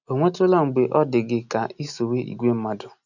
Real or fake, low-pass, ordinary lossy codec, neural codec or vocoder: real; 7.2 kHz; none; none